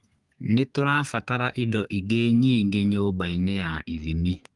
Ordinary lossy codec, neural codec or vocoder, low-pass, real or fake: Opus, 32 kbps; codec, 32 kHz, 1.9 kbps, SNAC; 10.8 kHz; fake